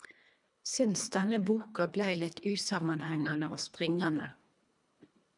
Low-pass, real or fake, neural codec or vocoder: 10.8 kHz; fake; codec, 24 kHz, 1.5 kbps, HILCodec